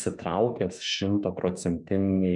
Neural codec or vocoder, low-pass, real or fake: autoencoder, 48 kHz, 32 numbers a frame, DAC-VAE, trained on Japanese speech; 10.8 kHz; fake